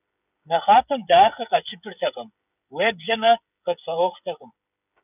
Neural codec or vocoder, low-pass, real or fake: codec, 16 kHz, 16 kbps, FreqCodec, smaller model; 3.6 kHz; fake